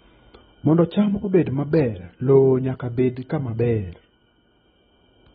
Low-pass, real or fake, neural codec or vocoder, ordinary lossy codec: 19.8 kHz; real; none; AAC, 16 kbps